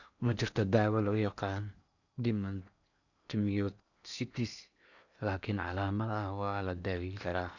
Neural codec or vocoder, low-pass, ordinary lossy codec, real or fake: codec, 16 kHz in and 24 kHz out, 0.8 kbps, FocalCodec, streaming, 65536 codes; 7.2 kHz; none; fake